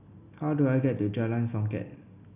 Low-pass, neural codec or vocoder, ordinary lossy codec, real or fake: 3.6 kHz; none; AAC, 24 kbps; real